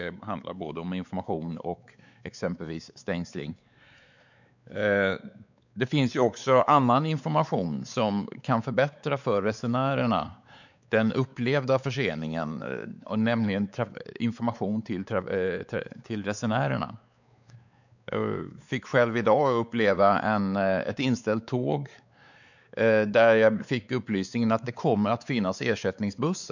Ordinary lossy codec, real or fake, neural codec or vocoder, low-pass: none; fake; codec, 16 kHz, 4 kbps, X-Codec, WavLM features, trained on Multilingual LibriSpeech; 7.2 kHz